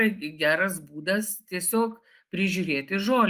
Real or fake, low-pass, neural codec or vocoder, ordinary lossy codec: real; 14.4 kHz; none; Opus, 32 kbps